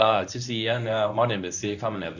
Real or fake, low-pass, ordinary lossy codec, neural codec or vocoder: fake; 7.2 kHz; none; codec, 24 kHz, 0.9 kbps, WavTokenizer, medium speech release version 1